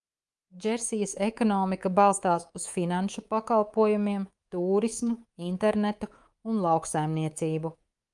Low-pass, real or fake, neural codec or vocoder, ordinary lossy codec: 10.8 kHz; fake; codec, 24 kHz, 3.1 kbps, DualCodec; Opus, 32 kbps